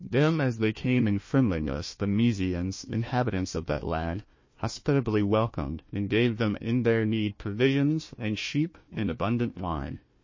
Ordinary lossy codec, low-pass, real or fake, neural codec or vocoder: MP3, 32 kbps; 7.2 kHz; fake; codec, 16 kHz, 1 kbps, FunCodec, trained on Chinese and English, 50 frames a second